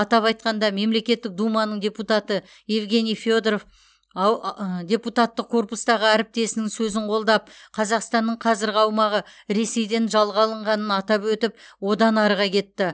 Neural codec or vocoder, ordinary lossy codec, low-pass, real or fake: none; none; none; real